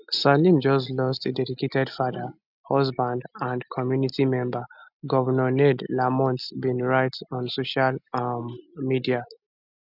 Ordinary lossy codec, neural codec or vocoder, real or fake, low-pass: none; none; real; 5.4 kHz